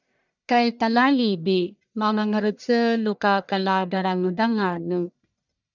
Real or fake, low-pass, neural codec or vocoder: fake; 7.2 kHz; codec, 44.1 kHz, 1.7 kbps, Pupu-Codec